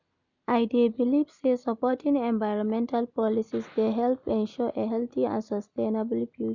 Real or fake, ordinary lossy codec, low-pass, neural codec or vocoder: real; none; 7.2 kHz; none